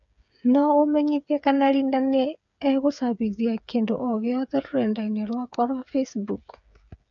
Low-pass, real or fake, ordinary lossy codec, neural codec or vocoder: 7.2 kHz; fake; none; codec, 16 kHz, 8 kbps, FreqCodec, smaller model